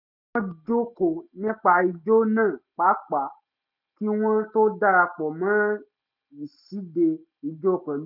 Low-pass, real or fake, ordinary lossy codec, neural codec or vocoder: 5.4 kHz; real; none; none